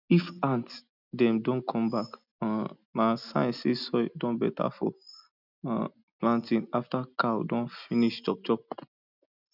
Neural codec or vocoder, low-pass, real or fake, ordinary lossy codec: none; 5.4 kHz; real; none